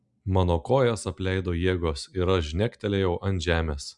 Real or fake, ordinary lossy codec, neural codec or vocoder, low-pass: real; MP3, 96 kbps; none; 10.8 kHz